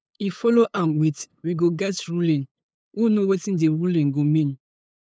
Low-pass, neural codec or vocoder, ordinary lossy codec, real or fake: none; codec, 16 kHz, 8 kbps, FunCodec, trained on LibriTTS, 25 frames a second; none; fake